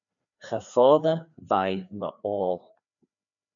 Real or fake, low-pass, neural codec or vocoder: fake; 7.2 kHz; codec, 16 kHz, 2 kbps, FreqCodec, larger model